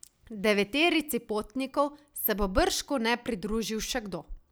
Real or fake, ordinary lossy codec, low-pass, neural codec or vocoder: real; none; none; none